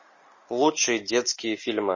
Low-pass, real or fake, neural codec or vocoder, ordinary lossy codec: 7.2 kHz; real; none; MP3, 32 kbps